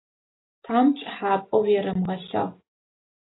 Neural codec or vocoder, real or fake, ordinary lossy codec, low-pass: none; real; AAC, 16 kbps; 7.2 kHz